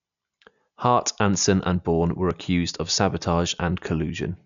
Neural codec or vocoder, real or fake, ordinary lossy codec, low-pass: none; real; none; 7.2 kHz